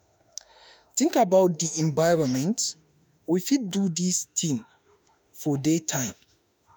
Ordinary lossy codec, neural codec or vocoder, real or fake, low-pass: none; autoencoder, 48 kHz, 32 numbers a frame, DAC-VAE, trained on Japanese speech; fake; none